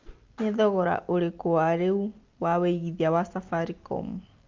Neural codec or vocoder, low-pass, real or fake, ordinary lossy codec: none; 7.2 kHz; real; Opus, 24 kbps